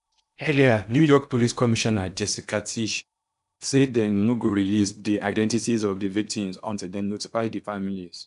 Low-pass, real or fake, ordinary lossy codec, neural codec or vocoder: 10.8 kHz; fake; none; codec, 16 kHz in and 24 kHz out, 0.8 kbps, FocalCodec, streaming, 65536 codes